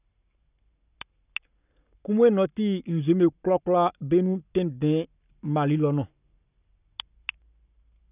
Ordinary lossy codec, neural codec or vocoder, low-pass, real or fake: none; codec, 44.1 kHz, 7.8 kbps, Pupu-Codec; 3.6 kHz; fake